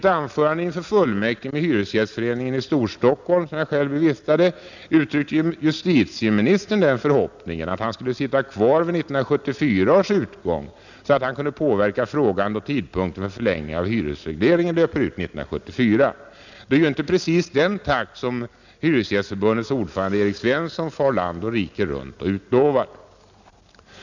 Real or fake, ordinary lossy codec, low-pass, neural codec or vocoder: real; none; 7.2 kHz; none